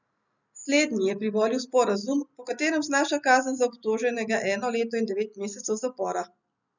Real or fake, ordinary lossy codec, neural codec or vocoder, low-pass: real; none; none; 7.2 kHz